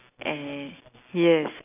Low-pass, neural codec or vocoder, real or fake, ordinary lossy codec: 3.6 kHz; none; real; none